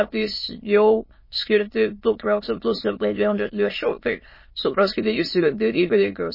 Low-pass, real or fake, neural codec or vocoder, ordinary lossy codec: 5.4 kHz; fake; autoencoder, 22.05 kHz, a latent of 192 numbers a frame, VITS, trained on many speakers; MP3, 24 kbps